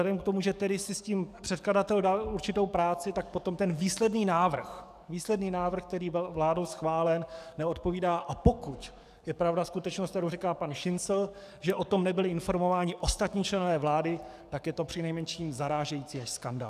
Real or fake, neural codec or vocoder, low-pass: fake; codec, 44.1 kHz, 7.8 kbps, DAC; 14.4 kHz